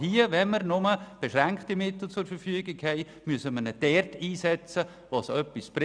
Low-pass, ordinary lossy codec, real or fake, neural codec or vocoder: 9.9 kHz; none; real; none